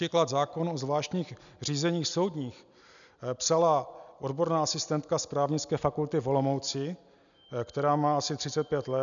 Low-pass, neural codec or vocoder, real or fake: 7.2 kHz; none; real